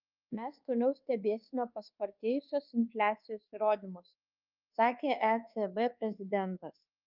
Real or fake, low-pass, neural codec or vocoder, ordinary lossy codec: fake; 5.4 kHz; codec, 24 kHz, 1.2 kbps, DualCodec; Opus, 24 kbps